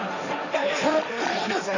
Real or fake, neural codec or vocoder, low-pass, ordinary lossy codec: fake; codec, 16 kHz, 1.1 kbps, Voila-Tokenizer; 7.2 kHz; none